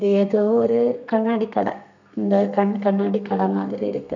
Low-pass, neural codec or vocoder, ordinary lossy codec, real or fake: 7.2 kHz; codec, 32 kHz, 1.9 kbps, SNAC; none; fake